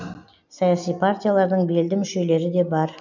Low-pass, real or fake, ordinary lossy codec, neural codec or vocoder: 7.2 kHz; real; none; none